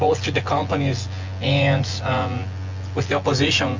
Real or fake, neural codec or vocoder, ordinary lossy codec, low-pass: fake; vocoder, 24 kHz, 100 mel bands, Vocos; Opus, 32 kbps; 7.2 kHz